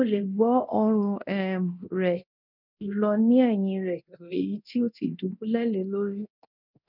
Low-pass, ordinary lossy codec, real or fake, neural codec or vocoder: 5.4 kHz; none; fake; codec, 24 kHz, 0.9 kbps, DualCodec